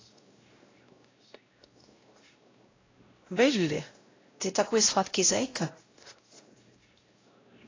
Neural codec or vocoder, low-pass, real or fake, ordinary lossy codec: codec, 16 kHz, 0.5 kbps, X-Codec, WavLM features, trained on Multilingual LibriSpeech; 7.2 kHz; fake; AAC, 32 kbps